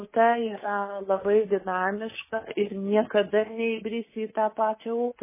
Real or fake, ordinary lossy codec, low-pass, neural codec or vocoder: fake; MP3, 16 kbps; 3.6 kHz; codec, 24 kHz, 3.1 kbps, DualCodec